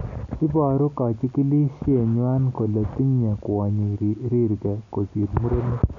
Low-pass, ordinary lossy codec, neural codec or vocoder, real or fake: 7.2 kHz; none; none; real